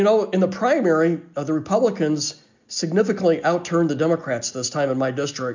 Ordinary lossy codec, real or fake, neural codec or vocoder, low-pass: MP3, 64 kbps; real; none; 7.2 kHz